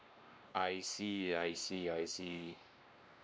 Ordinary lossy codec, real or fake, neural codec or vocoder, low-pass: none; fake; codec, 16 kHz, 2 kbps, X-Codec, WavLM features, trained on Multilingual LibriSpeech; none